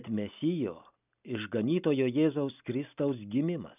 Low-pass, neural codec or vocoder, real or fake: 3.6 kHz; none; real